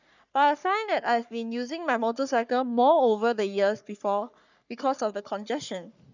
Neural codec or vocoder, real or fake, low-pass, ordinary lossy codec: codec, 44.1 kHz, 3.4 kbps, Pupu-Codec; fake; 7.2 kHz; none